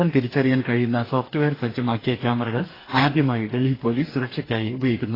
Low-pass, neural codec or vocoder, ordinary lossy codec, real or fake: 5.4 kHz; codec, 44.1 kHz, 2.6 kbps, DAC; AAC, 24 kbps; fake